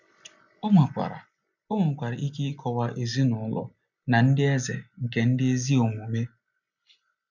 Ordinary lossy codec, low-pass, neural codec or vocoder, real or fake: none; 7.2 kHz; none; real